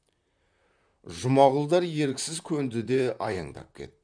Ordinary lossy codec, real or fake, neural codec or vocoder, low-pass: none; fake; vocoder, 44.1 kHz, 128 mel bands, Pupu-Vocoder; 9.9 kHz